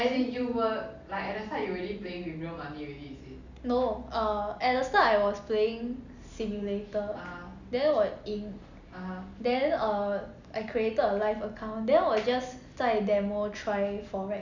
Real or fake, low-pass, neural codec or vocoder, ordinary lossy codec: real; 7.2 kHz; none; none